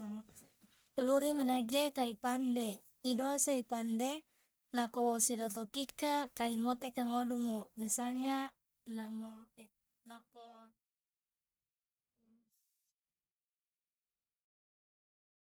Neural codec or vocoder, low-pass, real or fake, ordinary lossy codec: codec, 44.1 kHz, 1.7 kbps, Pupu-Codec; none; fake; none